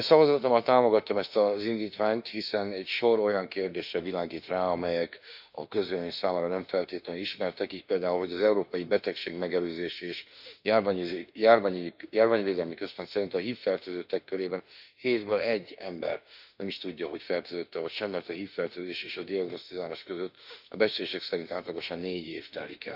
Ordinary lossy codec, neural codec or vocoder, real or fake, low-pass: none; autoencoder, 48 kHz, 32 numbers a frame, DAC-VAE, trained on Japanese speech; fake; 5.4 kHz